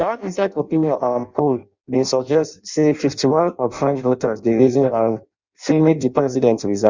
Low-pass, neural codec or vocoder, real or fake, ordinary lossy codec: 7.2 kHz; codec, 16 kHz in and 24 kHz out, 0.6 kbps, FireRedTTS-2 codec; fake; Opus, 64 kbps